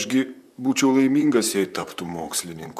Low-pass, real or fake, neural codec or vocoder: 14.4 kHz; fake; vocoder, 44.1 kHz, 128 mel bands, Pupu-Vocoder